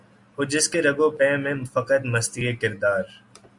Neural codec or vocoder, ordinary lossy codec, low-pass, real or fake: none; Opus, 64 kbps; 10.8 kHz; real